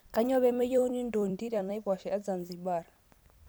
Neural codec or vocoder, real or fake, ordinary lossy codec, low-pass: vocoder, 44.1 kHz, 128 mel bands every 256 samples, BigVGAN v2; fake; none; none